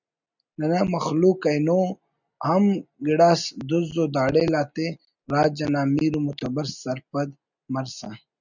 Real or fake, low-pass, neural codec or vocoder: real; 7.2 kHz; none